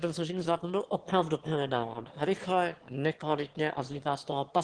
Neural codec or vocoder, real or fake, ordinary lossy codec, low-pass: autoencoder, 22.05 kHz, a latent of 192 numbers a frame, VITS, trained on one speaker; fake; Opus, 16 kbps; 9.9 kHz